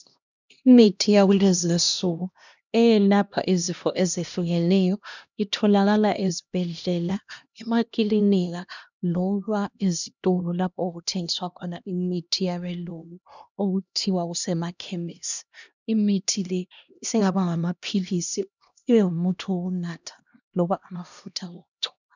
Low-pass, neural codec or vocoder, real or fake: 7.2 kHz; codec, 16 kHz, 1 kbps, X-Codec, HuBERT features, trained on LibriSpeech; fake